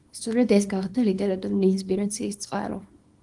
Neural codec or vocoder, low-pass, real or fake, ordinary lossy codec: codec, 24 kHz, 0.9 kbps, WavTokenizer, small release; 10.8 kHz; fake; Opus, 24 kbps